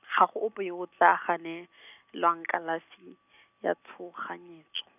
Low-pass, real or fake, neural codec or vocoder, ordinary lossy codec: 3.6 kHz; real; none; none